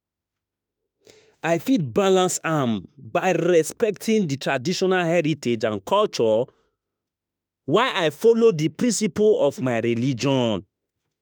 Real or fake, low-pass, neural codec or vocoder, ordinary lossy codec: fake; none; autoencoder, 48 kHz, 32 numbers a frame, DAC-VAE, trained on Japanese speech; none